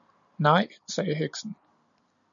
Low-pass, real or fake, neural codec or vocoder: 7.2 kHz; real; none